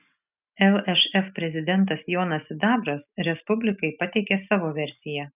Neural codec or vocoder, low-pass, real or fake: none; 3.6 kHz; real